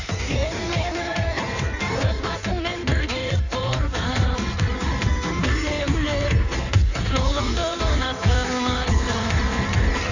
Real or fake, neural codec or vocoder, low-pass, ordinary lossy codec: fake; codec, 16 kHz in and 24 kHz out, 1.1 kbps, FireRedTTS-2 codec; 7.2 kHz; none